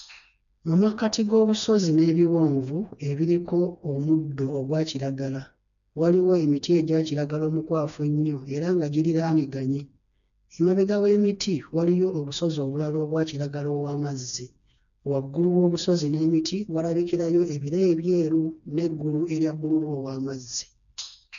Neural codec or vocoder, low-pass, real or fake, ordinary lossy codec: codec, 16 kHz, 2 kbps, FreqCodec, smaller model; 7.2 kHz; fake; none